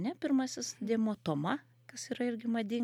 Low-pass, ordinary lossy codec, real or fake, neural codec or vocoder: 19.8 kHz; MP3, 96 kbps; real; none